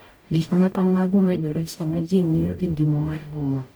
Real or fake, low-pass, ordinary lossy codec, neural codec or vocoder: fake; none; none; codec, 44.1 kHz, 0.9 kbps, DAC